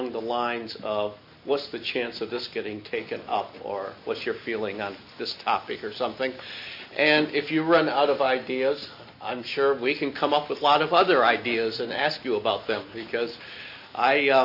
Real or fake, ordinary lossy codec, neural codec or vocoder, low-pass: real; MP3, 32 kbps; none; 5.4 kHz